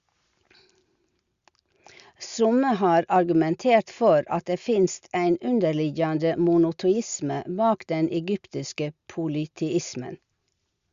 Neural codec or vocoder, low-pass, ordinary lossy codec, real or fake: none; 7.2 kHz; Opus, 64 kbps; real